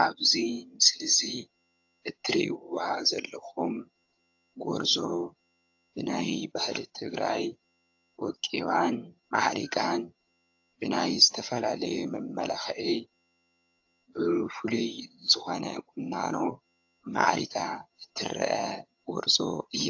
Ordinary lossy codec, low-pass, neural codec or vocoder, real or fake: AAC, 48 kbps; 7.2 kHz; vocoder, 22.05 kHz, 80 mel bands, HiFi-GAN; fake